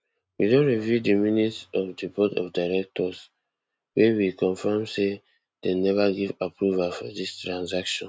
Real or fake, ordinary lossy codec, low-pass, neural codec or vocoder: real; none; none; none